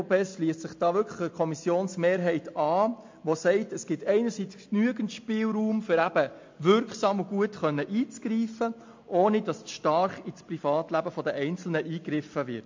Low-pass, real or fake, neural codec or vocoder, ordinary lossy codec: 7.2 kHz; real; none; MP3, 48 kbps